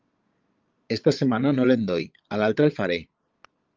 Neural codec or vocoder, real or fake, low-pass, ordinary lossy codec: vocoder, 22.05 kHz, 80 mel bands, Vocos; fake; 7.2 kHz; Opus, 24 kbps